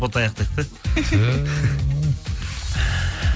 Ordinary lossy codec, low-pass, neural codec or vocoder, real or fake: none; none; none; real